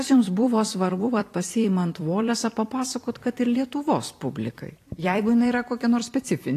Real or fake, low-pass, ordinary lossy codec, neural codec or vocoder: fake; 14.4 kHz; AAC, 48 kbps; vocoder, 44.1 kHz, 128 mel bands every 256 samples, BigVGAN v2